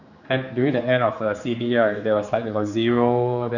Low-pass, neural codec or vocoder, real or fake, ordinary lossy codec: 7.2 kHz; codec, 16 kHz, 4 kbps, X-Codec, HuBERT features, trained on general audio; fake; none